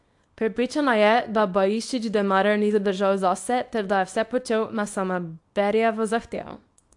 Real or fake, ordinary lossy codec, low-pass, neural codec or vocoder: fake; AAC, 64 kbps; 10.8 kHz; codec, 24 kHz, 0.9 kbps, WavTokenizer, small release